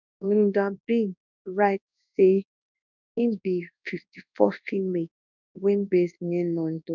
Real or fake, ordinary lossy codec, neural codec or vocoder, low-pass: fake; none; codec, 24 kHz, 0.9 kbps, WavTokenizer, large speech release; 7.2 kHz